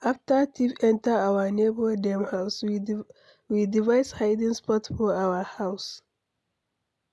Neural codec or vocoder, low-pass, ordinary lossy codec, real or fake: none; none; none; real